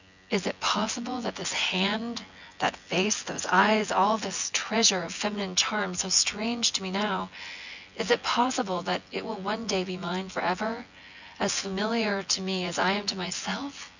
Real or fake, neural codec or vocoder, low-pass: fake; vocoder, 24 kHz, 100 mel bands, Vocos; 7.2 kHz